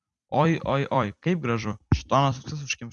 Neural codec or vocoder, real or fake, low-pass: vocoder, 44.1 kHz, 128 mel bands every 256 samples, BigVGAN v2; fake; 10.8 kHz